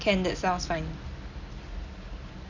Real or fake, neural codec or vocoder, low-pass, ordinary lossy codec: real; none; 7.2 kHz; none